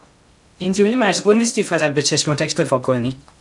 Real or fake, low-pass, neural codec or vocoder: fake; 10.8 kHz; codec, 16 kHz in and 24 kHz out, 0.6 kbps, FocalCodec, streaming, 2048 codes